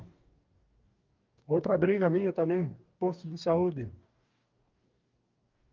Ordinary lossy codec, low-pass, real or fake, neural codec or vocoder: Opus, 32 kbps; 7.2 kHz; fake; codec, 44.1 kHz, 2.6 kbps, DAC